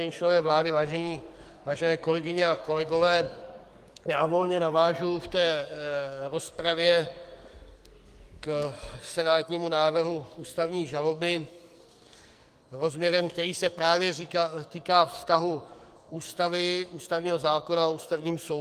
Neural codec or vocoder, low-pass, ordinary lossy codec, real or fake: codec, 44.1 kHz, 2.6 kbps, SNAC; 14.4 kHz; Opus, 32 kbps; fake